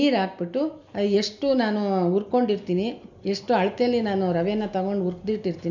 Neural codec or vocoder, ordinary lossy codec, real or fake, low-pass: none; none; real; 7.2 kHz